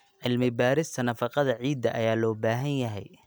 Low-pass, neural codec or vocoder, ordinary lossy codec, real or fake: none; none; none; real